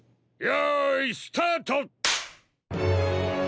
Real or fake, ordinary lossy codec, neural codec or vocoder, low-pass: real; none; none; none